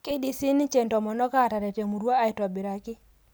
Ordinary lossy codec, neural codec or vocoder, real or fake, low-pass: none; none; real; none